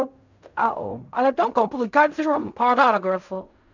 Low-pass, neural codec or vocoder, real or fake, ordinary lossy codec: 7.2 kHz; codec, 16 kHz in and 24 kHz out, 0.4 kbps, LongCat-Audio-Codec, fine tuned four codebook decoder; fake; none